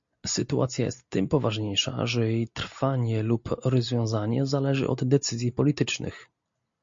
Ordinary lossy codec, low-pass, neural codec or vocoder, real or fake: MP3, 48 kbps; 7.2 kHz; none; real